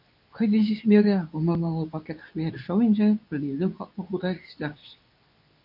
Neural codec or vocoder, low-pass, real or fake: codec, 24 kHz, 0.9 kbps, WavTokenizer, medium speech release version 2; 5.4 kHz; fake